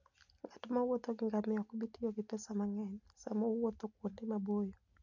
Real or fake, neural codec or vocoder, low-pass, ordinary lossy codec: real; none; 7.2 kHz; none